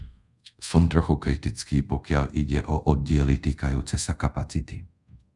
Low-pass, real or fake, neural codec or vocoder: 10.8 kHz; fake; codec, 24 kHz, 0.5 kbps, DualCodec